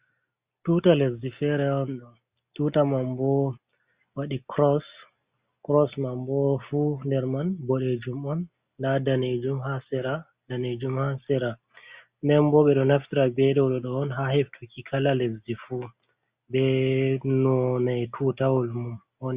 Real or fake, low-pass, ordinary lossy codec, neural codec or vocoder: real; 3.6 kHz; Opus, 64 kbps; none